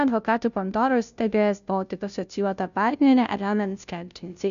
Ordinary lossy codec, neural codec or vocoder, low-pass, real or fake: MP3, 96 kbps; codec, 16 kHz, 0.5 kbps, FunCodec, trained on Chinese and English, 25 frames a second; 7.2 kHz; fake